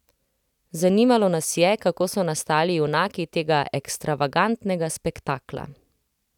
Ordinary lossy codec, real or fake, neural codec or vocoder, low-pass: none; real; none; 19.8 kHz